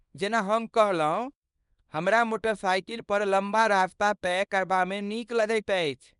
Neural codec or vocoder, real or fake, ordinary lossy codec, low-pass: codec, 24 kHz, 0.9 kbps, WavTokenizer, small release; fake; none; 10.8 kHz